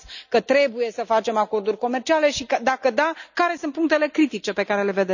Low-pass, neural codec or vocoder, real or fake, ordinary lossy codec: 7.2 kHz; none; real; none